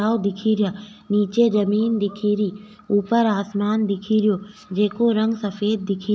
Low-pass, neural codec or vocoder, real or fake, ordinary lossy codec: none; none; real; none